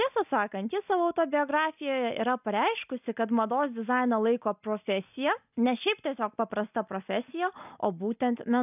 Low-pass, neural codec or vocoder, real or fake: 3.6 kHz; none; real